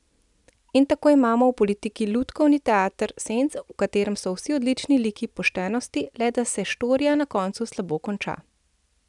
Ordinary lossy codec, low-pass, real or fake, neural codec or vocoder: none; 10.8 kHz; real; none